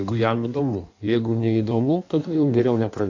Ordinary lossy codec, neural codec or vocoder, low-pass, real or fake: AAC, 48 kbps; codec, 16 kHz in and 24 kHz out, 1.1 kbps, FireRedTTS-2 codec; 7.2 kHz; fake